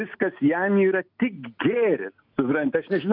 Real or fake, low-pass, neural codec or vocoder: real; 5.4 kHz; none